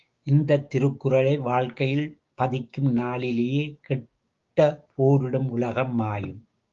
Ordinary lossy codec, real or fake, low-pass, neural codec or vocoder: Opus, 32 kbps; fake; 7.2 kHz; codec, 16 kHz, 6 kbps, DAC